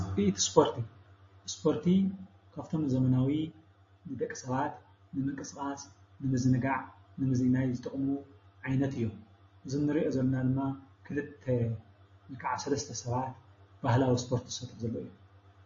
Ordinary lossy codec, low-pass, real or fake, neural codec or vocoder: MP3, 32 kbps; 7.2 kHz; real; none